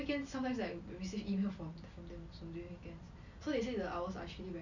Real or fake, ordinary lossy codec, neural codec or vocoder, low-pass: real; none; none; 7.2 kHz